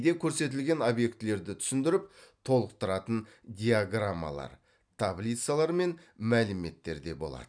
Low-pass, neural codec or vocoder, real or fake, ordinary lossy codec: 9.9 kHz; none; real; none